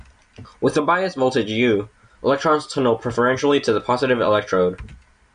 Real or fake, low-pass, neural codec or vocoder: real; 9.9 kHz; none